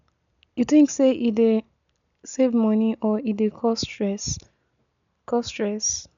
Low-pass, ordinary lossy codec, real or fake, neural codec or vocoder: 7.2 kHz; none; real; none